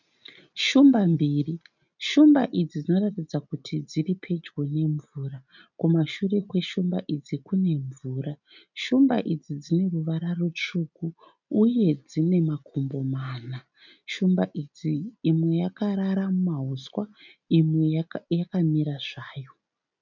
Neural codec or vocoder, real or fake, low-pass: none; real; 7.2 kHz